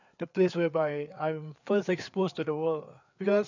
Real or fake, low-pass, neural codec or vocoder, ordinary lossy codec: fake; 7.2 kHz; codec, 16 kHz, 4 kbps, FreqCodec, larger model; none